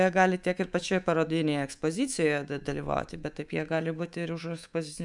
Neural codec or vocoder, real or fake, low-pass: codec, 24 kHz, 3.1 kbps, DualCodec; fake; 10.8 kHz